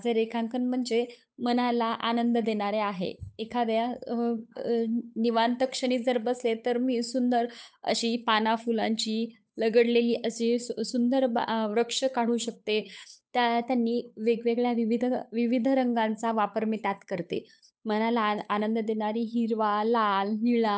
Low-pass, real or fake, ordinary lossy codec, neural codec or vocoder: none; fake; none; codec, 16 kHz, 8 kbps, FunCodec, trained on Chinese and English, 25 frames a second